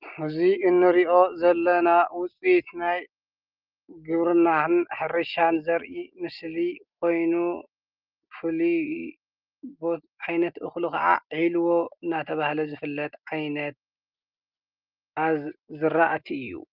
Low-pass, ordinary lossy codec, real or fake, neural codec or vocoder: 5.4 kHz; Opus, 32 kbps; real; none